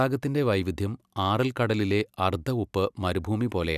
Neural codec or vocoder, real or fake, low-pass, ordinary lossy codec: none; real; 14.4 kHz; none